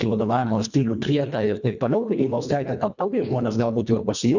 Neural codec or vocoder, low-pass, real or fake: codec, 24 kHz, 1.5 kbps, HILCodec; 7.2 kHz; fake